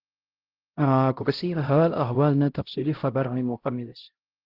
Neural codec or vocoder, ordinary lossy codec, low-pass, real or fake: codec, 16 kHz, 0.5 kbps, X-Codec, HuBERT features, trained on LibriSpeech; Opus, 16 kbps; 5.4 kHz; fake